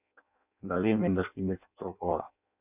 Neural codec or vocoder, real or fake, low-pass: codec, 16 kHz in and 24 kHz out, 0.6 kbps, FireRedTTS-2 codec; fake; 3.6 kHz